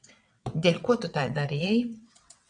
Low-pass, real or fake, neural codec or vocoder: 9.9 kHz; fake; vocoder, 22.05 kHz, 80 mel bands, WaveNeXt